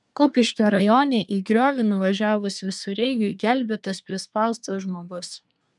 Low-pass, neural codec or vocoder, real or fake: 10.8 kHz; codec, 24 kHz, 1 kbps, SNAC; fake